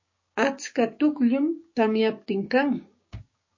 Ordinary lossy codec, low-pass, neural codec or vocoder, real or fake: MP3, 32 kbps; 7.2 kHz; codec, 16 kHz, 6 kbps, DAC; fake